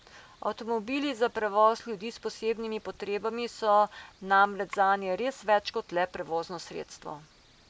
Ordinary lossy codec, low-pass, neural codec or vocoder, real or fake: none; none; none; real